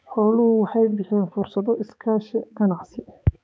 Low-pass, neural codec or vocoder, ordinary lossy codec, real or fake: none; codec, 16 kHz, 4 kbps, X-Codec, HuBERT features, trained on balanced general audio; none; fake